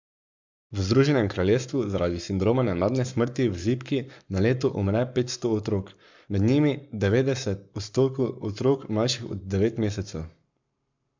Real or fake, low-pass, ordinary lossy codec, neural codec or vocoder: fake; 7.2 kHz; MP3, 64 kbps; codec, 44.1 kHz, 7.8 kbps, Pupu-Codec